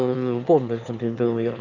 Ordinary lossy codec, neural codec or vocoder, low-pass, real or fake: none; autoencoder, 22.05 kHz, a latent of 192 numbers a frame, VITS, trained on one speaker; 7.2 kHz; fake